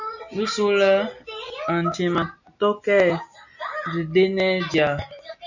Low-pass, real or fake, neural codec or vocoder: 7.2 kHz; real; none